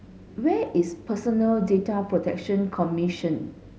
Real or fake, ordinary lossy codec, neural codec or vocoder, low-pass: real; none; none; none